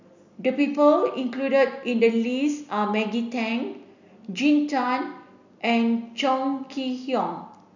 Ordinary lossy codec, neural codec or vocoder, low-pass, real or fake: none; none; 7.2 kHz; real